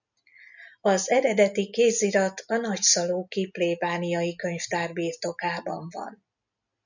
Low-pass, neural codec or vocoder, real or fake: 7.2 kHz; none; real